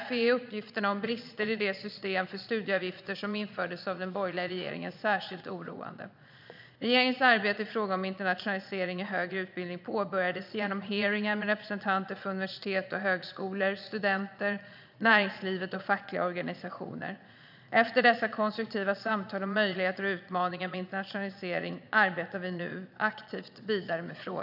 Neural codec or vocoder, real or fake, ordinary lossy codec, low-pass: vocoder, 22.05 kHz, 80 mel bands, Vocos; fake; none; 5.4 kHz